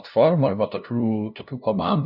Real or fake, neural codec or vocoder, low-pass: fake; codec, 16 kHz, 0.5 kbps, FunCodec, trained on LibriTTS, 25 frames a second; 5.4 kHz